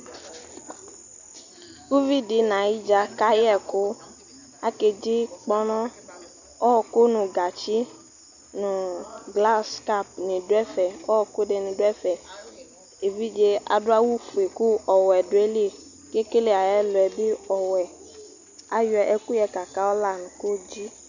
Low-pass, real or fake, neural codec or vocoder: 7.2 kHz; real; none